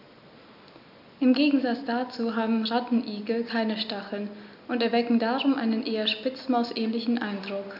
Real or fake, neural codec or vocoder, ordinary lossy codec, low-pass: real; none; none; 5.4 kHz